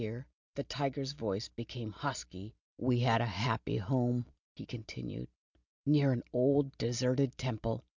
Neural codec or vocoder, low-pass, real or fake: none; 7.2 kHz; real